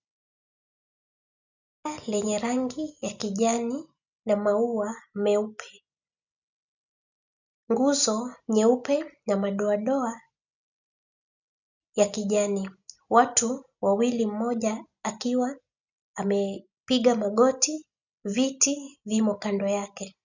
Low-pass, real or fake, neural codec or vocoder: 7.2 kHz; real; none